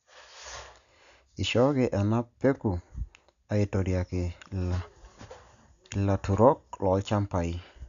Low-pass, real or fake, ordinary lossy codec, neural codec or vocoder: 7.2 kHz; real; none; none